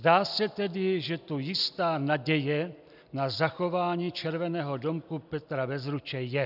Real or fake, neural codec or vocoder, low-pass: real; none; 5.4 kHz